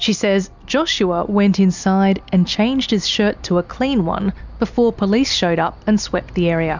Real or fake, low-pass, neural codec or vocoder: real; 7.2 kHz; none